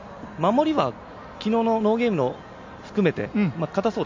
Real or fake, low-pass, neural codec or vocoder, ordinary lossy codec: real; 7.2 kHz; none; MP3, 48 kbps